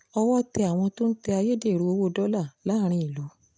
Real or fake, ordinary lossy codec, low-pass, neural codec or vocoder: real; none; none; none